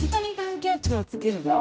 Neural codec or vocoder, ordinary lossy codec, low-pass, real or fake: codec, 16 kHz, 0.5 kbps, X-Codec, HuBERT features, trained on general audio; none; none; fake